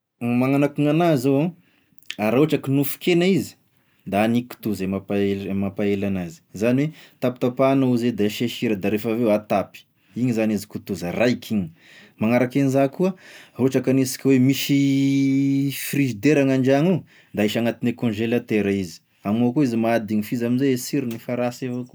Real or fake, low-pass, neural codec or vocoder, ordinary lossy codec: real; none; none; none